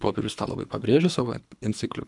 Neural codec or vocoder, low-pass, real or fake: codec, 24 kHz, 3 kbps, HILCodec; 10.8 kHz; fake